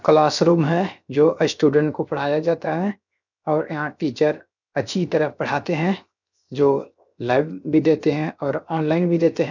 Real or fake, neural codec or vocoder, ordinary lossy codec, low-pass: fake; codec, 16 kHz, 0.7 kbps, FocalCodec; none; 7.2 kHz